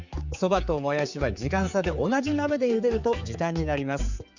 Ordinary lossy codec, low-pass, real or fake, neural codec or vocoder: Opus, 64 kbps; 7.2 kHz; fake; codec, 16 kHz, 4 kbps, X-Codec, HuBERT features, trained on general audio